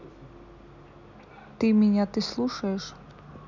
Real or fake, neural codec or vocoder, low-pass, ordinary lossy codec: real; none; 7.2 kHz; none